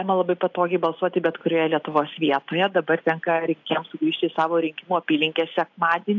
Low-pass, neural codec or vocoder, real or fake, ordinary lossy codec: 7.2 kHz; none; real; AAC, 48 kbps